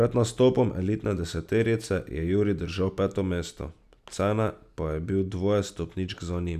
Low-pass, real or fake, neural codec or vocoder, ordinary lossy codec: 14.4 kHz; real; none; none